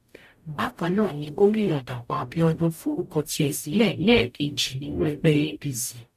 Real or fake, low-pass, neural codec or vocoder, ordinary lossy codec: fake; 14.4 kHz; codec, 44.1 kHz, 0.9 kbps, DAC; none